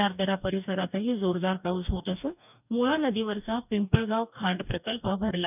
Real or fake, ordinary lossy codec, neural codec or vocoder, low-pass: fake; none; codec, 44.1 kHz, 2.6 kbps, DAC; 3.6 kHz